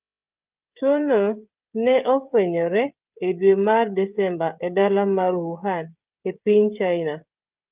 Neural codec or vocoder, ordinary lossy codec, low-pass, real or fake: codec, 16 kHz, 16 kbps, FreqCodec, smaller model; Opus, 32 kbps; 3.6 kHz; fake